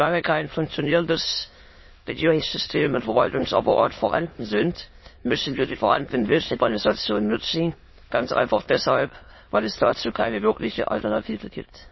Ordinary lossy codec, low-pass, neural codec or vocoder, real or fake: MP3, 24 kbps; 7.2 kHz; autoencoder, 22.05 kHz, a latent of 192 numbers a frame, VITS, trained on many speakers; fake